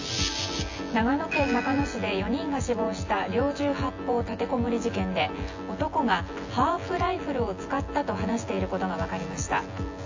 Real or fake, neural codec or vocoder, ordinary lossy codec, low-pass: fake; vocoder, 24 kHz, 100 mel bands, Vocos; AAC, 48 kbps; 7.2 kHz